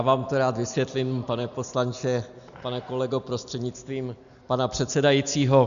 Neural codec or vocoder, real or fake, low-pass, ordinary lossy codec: none; real; 7.2 kHz; MP3, 96 kbps